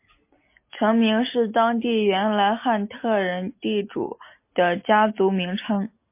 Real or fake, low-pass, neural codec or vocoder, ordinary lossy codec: real; 3.6 kHz; none; MP3, 24 kbps